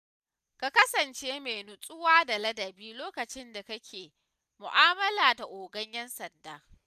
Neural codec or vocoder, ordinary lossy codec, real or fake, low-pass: none; none; real; 14.4 kHz